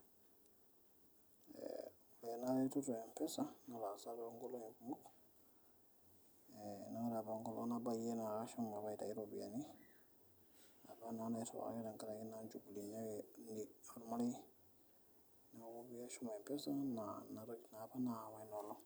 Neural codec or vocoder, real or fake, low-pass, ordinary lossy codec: none; real; none; none